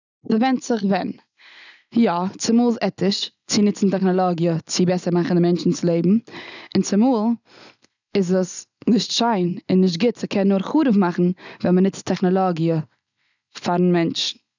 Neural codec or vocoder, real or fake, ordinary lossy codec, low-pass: none; real; none; 7.2 kHz